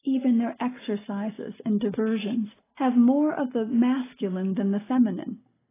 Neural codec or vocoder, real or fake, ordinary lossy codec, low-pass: none; real; AAC, 16 kbps; 3.6 kHz